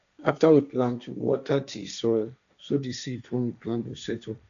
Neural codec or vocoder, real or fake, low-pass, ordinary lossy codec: codec, 16 kHz, 1.1 kbps, Voila-Tokenizer; fake; 7.2 kHz; none